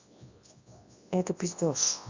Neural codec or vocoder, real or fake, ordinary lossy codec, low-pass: codec, 24 kHz, 0.9 kbps, WavTokenizer, large speech release; fake; AAC, 48 kbps; 7.2 kHz